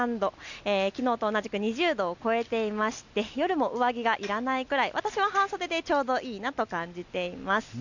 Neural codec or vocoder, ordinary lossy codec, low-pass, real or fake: none; none; 7.2 kHz; real